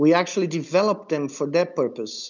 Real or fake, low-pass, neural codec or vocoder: real; 7.2 kHz; none